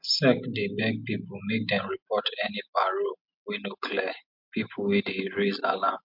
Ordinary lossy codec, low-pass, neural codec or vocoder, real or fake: MP3, 48 kbps; 5.4 kHz; none; real